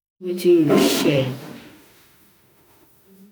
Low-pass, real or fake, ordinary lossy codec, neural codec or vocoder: none; fake; none; autoencoder, 48 kHz, 32 numbers a frame, DAC-VAE, trained on Japanese speech